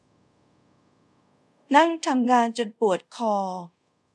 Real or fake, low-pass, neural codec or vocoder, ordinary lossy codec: fake; none; codec, 24 kHz, 0.5 kbps, DualCodec; none